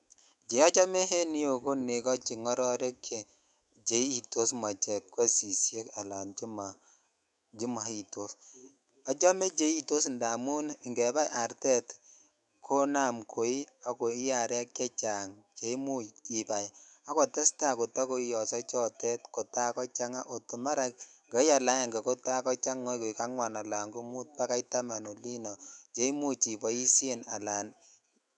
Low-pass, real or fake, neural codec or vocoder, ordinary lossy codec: 10.8 kHz; fake; autoencoder, 48 kHz, 128 numbers a frame, DAC-VAE, trained on Japanese speech; none